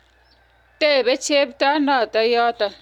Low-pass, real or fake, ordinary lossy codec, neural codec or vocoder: 19.8 kHz; real; none; none